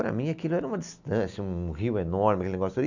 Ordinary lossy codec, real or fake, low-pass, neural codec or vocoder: none; real; 7.2 kHz; none